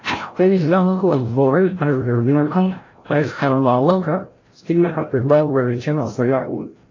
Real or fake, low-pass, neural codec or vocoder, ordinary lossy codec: fake; 7.2 kHz; codec, 16 kHz, 0.5 kbps, FreqCodec, larger model; AAC, 32 kbps